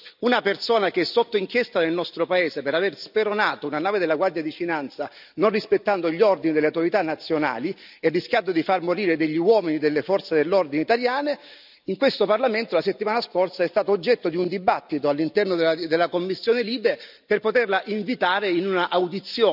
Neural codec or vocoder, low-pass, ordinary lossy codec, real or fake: none; 5.4 kHz; none; real